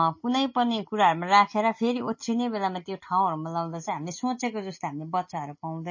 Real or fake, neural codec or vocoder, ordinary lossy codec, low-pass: real; none; MP3, 32 kbps; 7.2 kHz